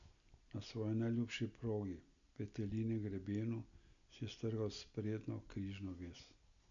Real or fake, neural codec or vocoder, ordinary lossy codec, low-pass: real; none; none; 7.2 kHz